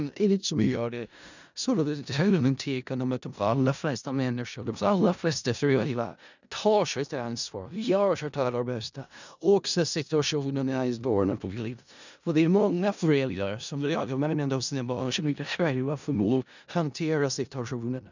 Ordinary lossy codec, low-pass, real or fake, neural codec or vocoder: none; 7.2 kHz; fake; codec, 16 kHz in and 24 kHz out, 0.4 kbps, LongCat-Audio-Codec, four codebook decoder